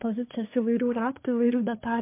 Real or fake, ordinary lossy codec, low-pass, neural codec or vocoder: fake; MP3, 24 kbps; 3.6 kHz; codec, 24 kHz, 1 kbps, SNAC